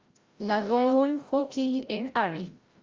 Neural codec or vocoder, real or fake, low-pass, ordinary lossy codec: codec, 16 kHz, 0.5 kbps, FreqCodec, larger model; fake; 7.2 kHz; Opus, 32 kbps